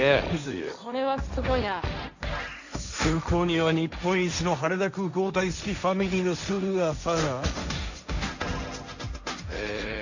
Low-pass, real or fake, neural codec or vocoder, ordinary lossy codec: 7.2 kHz; fake; codec, 16 kHz, 1.1 kbps, Voila-Tokenizer; none